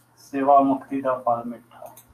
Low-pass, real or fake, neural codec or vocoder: 14.4 kHz; fake; codec, 44.1 kHz, 7.8 kbps, DAC